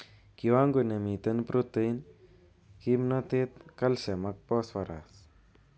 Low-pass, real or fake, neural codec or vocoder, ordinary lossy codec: none; real; none; none